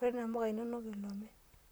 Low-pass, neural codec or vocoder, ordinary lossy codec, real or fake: none; vocoder, 44.1 kHz, 128 mel bands, Pupu-Vocoder; none; fake